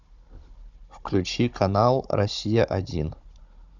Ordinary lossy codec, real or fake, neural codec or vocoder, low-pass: Opus, 64 kbps; fake; codec, 16 kHz, 16 kbps, FunCodec, trained on Chinese and English, 50 frames a second; 7.2 kHz